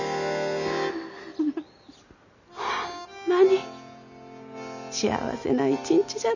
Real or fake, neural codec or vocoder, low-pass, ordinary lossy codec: real; none; 7.2 kHz; none